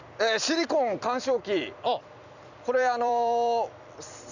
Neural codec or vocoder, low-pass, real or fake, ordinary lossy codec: vocoder, 44.1 kHz, 128 mel bands every 256 samples, BigVGAN v2; 7.2 kHz; fake; none